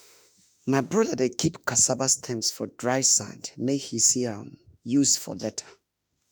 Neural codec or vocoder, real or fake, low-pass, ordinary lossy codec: autoencoder, 48 kHz, 32 numbers a frame, DAC-VAE, trained on Japanese speech; fake; none; none